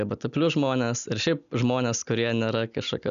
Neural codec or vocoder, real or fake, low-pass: none; real; 7.2 kHz